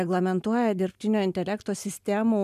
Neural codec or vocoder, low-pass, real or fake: none; 14.4 kHz; real